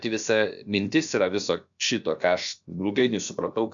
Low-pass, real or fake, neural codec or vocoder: 7.2 kHz; fake; codec, 16 kHz, about 1 kbps, DyCAST, with the encoder's durations